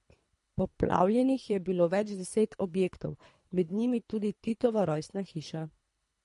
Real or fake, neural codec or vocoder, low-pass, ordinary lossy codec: fake; codec, 24 kHz, 3 kbps, HILCodec; 10.8 kHz; MP3, 48 kbps